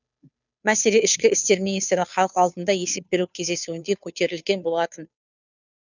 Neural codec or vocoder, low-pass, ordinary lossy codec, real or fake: codec, 16 kHz, 2 kbps, FunCodec, trained on Chinese and English, 25 frames a second; 7.2 kHz; none; fake